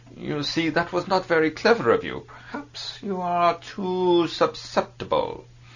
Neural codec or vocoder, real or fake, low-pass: none; real; 7.2 kHz